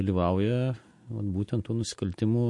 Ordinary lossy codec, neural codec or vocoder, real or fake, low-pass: MP3, 48 kbps; none; real; 10.8 kHz